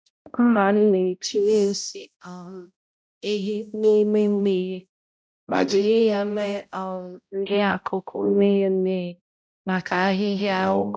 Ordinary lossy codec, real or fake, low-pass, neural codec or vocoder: none; fake; none; codec, 16 kHz, 0.5 kbps, X-Codec, HuBERT features, trained on balanced general audio